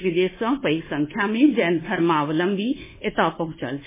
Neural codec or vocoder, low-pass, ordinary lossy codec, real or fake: codec, 24 kHz, 1.2 kbps, DualCodec; 3.6 kHz; MP3, 16 kbps; fake